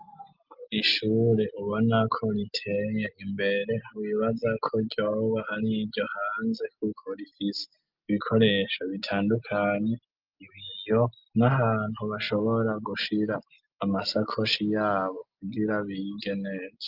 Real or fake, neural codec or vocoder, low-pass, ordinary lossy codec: real; none; 5.4 kHz; Opus, 24 kbps